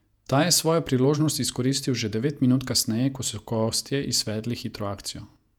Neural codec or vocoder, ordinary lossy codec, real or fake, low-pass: vocoder, 48 kHz, 128 mel bands, Vocos; none; fake; 19.8 kHz